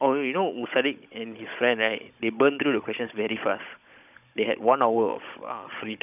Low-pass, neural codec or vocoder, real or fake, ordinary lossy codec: 3.6 kHz; codec, 16 kHz, 16 kbps, FunCodec, trained on Chinese and English, 50 frames a second; fake; none